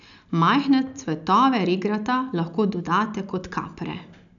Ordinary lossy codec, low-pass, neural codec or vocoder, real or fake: none; 7.2 kHz; none; real